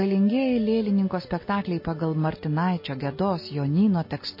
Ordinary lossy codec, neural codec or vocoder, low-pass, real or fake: MP3, 24 kbps; none; 5.4 kHz; real